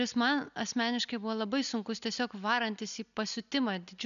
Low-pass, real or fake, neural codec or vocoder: 7.2 kHz; real; none